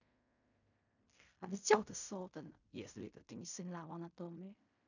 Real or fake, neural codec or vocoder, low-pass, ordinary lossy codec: fake; codec, 16 kHz in and 24 kHz out, 0.4 kbps, LongCat-Audio-Codec, fine tuned four codebook decoder; 7.2 kHz; none